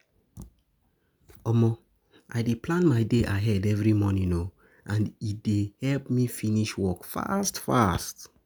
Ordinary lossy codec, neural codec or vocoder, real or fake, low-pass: none; none; real; none